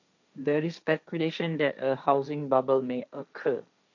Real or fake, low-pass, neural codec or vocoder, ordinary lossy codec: fake; 7.2 kHz; codec, 16 kHz, 1.1 kbps, Voila-Tokenizer; none